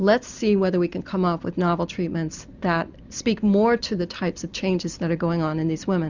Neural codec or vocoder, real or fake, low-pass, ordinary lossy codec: none; real; 7.2 kHz; Opus, 64 kbps